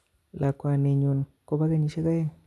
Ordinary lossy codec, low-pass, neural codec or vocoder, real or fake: none; none; none; real